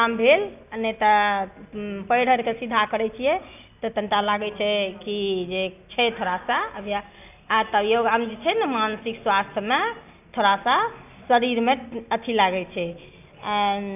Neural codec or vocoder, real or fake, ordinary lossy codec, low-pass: none; real; none; 3.6 kHz